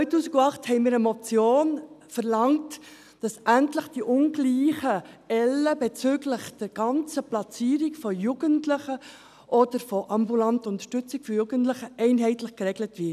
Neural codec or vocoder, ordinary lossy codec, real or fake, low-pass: none; none; real; 14.4 kHz